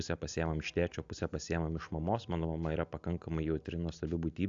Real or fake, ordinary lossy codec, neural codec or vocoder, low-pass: real; AAC, 64 kbps; none; 7.2 kHz